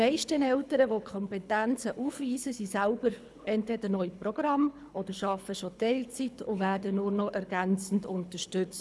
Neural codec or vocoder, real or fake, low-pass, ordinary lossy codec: codec, 24 kHz, 6 kbps, HILCodec; fake; none; none